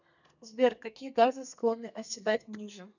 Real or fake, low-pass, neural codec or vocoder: fake; 7.2 kHz; codec, 32 kHz, 1.9 kbps, SNAC